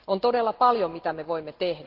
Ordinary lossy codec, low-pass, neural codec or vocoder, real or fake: Opus, 16 kbps; 5.4 kHz; none; real